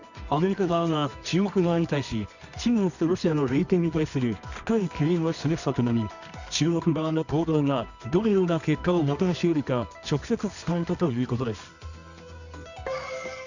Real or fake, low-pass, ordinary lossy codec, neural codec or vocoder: fake; 7.2 kHz; none; codec, 24 kHz, 0.9 kbps, WavTokenizer, medium music audio release